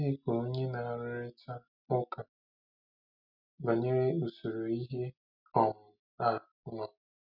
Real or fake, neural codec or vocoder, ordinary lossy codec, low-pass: real; none; none; 5.4 kHz